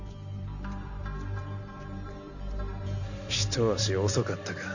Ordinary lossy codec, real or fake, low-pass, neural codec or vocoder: none; fake; 7.2 kHz; vocoder, 44.1 kHz, 128 mel bands every 512 samples, BigVGAN v2